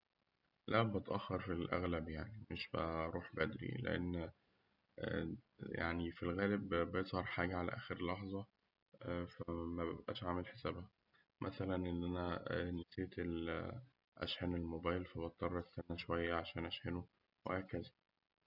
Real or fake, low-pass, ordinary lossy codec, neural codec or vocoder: real; 5.4 kHz; none; none